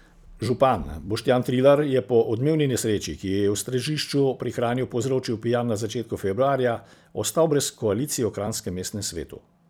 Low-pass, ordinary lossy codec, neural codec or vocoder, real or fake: none; none; vocoder, 44.1 kHz, 128 mel bands every 256 samples, BigVGAN v2; fake